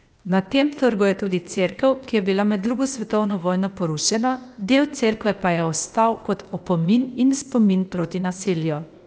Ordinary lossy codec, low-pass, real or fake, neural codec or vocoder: none; none; fake; codec, 16 kHz, 0.8 kbps, ZipCodec